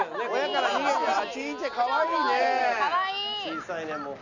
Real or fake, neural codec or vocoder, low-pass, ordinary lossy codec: real; none; 7.2 kHz; none